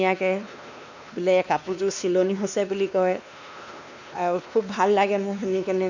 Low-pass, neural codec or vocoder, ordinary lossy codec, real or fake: 7.2 kHz; codec, 16 kHz, 2 kbps, X-Codec, WavLM features, trained on Multilingual LibriSpeech; none; fake